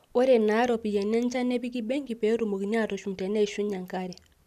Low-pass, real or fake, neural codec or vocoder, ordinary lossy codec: 14.4 kHz; real; none; none